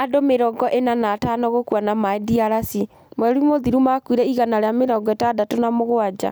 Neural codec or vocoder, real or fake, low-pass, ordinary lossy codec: none; real; none; none